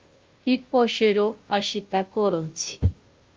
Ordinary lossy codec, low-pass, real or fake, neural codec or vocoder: Opus, 24 kbps; 7.2 kHz; fake; codec, 16 kHz, 0.5 kbps, FunCodec, trained on Chinese and English, 25 frames a second